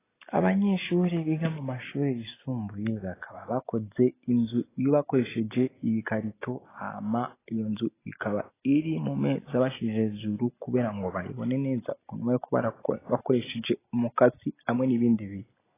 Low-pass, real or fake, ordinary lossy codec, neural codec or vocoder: 3.6 kHz; real; AAC, 16 kbps; none